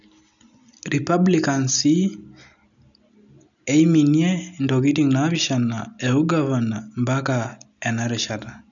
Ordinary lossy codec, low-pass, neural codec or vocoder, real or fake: none; 7.2 kHz; none; real